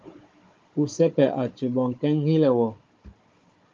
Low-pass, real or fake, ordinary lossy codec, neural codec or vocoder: 7.2 kHz; fake; Opus, 32 kbps; codec, 16 kHz, 16 kbps, FunCodec, trained on Chinese and English, 50 frames a second